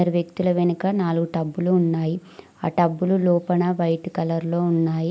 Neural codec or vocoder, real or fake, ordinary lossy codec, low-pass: none; real; none; none